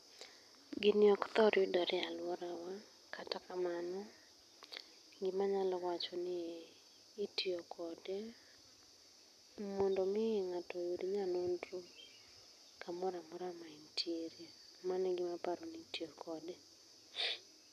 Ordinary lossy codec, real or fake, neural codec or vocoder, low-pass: none; real; none; 14.4 kHz